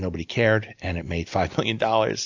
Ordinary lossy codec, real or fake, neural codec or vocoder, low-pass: AAC, 48 kbps; real; none; 7.2 kHz